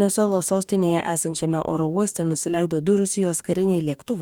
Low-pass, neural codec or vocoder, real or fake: 19.8 kHz; codec, 44.1 kHz, 2.6 kbps, DAC; fake